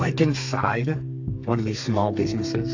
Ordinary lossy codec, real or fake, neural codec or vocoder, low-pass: AAC, 48 kbps; fake; codec, 32 kHz, 1.9 kbps, SNAC; 7.2 kHz